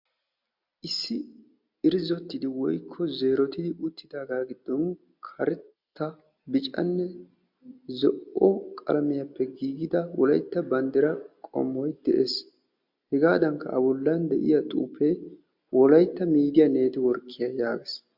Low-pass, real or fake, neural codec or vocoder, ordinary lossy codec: 5.4 kHz; real; none; MP3, 48 kbps